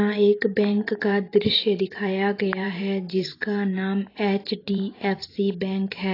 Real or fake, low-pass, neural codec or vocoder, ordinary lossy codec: fake; 5.4 kHz; vocoder, 44.1 kHz, 128 mel bands every 512 samples, BigVGAN v2; AAC, 24 kbps